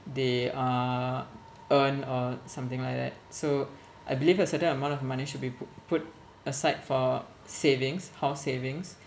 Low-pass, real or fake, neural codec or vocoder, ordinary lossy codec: none; real; none; none